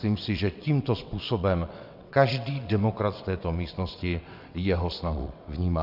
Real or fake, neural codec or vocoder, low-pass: fake; vocoder, 22.05 kHz, 80 mel bands, WaveNeXt; 5.4 kHz